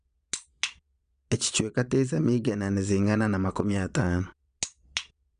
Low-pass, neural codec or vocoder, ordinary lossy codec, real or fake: 9.9 kHz; vocoder, 22.05 kHz, 80 mel bands, Vocos; none; fake